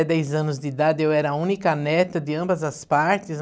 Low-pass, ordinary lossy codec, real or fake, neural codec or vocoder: none; none; real; none